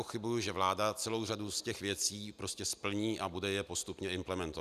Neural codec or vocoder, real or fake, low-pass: none; real; 14.4 kHz